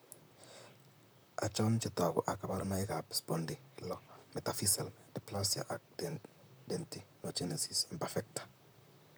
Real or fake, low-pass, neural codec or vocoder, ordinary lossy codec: fake; none; vocoder, 44.1 kHz, 128 mel bands, Pupu-Vocoder; none